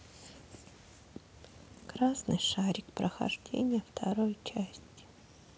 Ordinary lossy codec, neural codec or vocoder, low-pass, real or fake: none; none; none; real